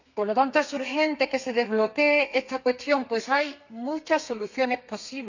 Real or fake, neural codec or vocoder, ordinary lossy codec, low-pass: fake; codec, 32 kHz, 1.9 kbps, SNAC; none; 7.2 kHz